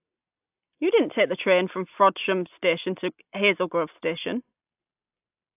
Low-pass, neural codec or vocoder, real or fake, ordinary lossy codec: 3.6 kHz; none; real; AAC, 32 kbps